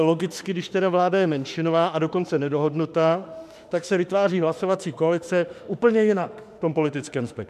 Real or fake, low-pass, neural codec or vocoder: fake; 14.4 kHz; autoencoder, 48 kHz, 32 numbers a frame, DAC-VAE, trained on Japanese speech